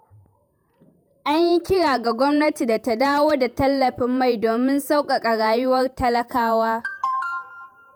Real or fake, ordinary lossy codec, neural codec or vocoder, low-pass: fake; none; vocoder, 48 kHz, 128 mel bands, Vocos; none